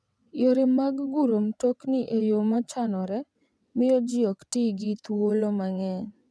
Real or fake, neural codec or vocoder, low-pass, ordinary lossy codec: fake; vocoder, 22.05 kHz, 80 mel bands, WaveNeXt; none; none